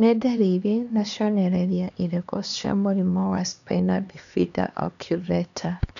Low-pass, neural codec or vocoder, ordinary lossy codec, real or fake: 7.2 kHz; codec, 16 kHz, 2 kbps, X-Codec, HuBERT features, trained on LibriSpeech; none; fake